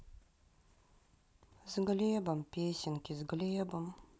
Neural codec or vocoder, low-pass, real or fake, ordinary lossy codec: none; none; real; none